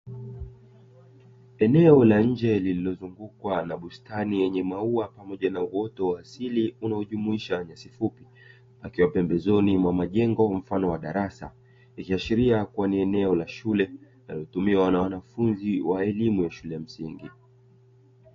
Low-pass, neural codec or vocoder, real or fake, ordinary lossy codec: 7.2 kHz; none; real; AAC, 32 kbps